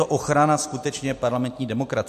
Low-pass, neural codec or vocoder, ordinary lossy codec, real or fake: 14.4 kHz; none; MP3, 64 kbps; real